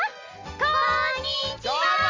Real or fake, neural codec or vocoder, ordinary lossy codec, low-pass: real; none; Opus, 32 kbps; 7.2 kHz